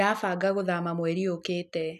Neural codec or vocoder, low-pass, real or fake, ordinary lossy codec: none; 14.4 kHz; real; none